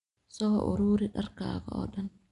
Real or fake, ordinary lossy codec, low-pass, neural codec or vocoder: real; none; 10.8 kHz; none